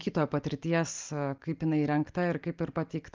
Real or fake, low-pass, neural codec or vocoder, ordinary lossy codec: real; 7.2 kHz; none; Opus, 24 kbps